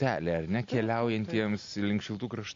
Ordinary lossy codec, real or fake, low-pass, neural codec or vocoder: AAC, 48 kbps; real; 7.2 kHz; none